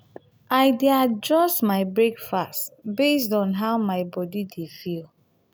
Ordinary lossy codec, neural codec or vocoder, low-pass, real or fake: none; none; none; real